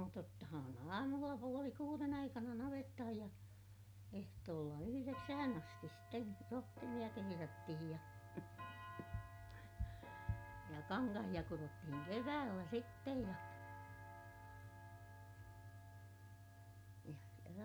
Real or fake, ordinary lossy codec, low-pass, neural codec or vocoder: real; none; none; none